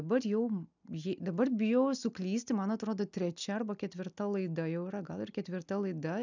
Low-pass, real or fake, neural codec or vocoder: 7.2 kHz; real; none